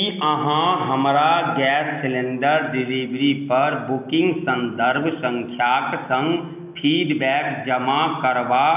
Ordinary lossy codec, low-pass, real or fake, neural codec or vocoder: none; 3.6 kHz; real; none